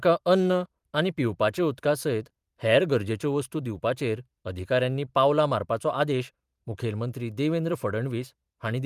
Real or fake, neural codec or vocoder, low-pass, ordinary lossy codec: fake; vocoder, 44.1 kHz, 128 mel bands every 512 samples, BigVGAN v2; 14.4 kHz; Opus, 32 kbps